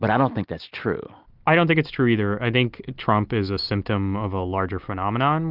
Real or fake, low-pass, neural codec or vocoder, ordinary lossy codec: real; 5.4 kHz; none; Opus, 24 kbps